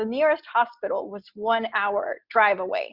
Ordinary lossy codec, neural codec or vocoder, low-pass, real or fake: Opus, 64 kbps; none; 5.4 kHz; real